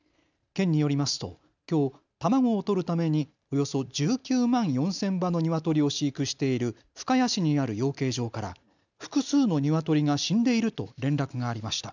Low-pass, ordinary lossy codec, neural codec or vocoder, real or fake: 7.2 kHz; none; none; real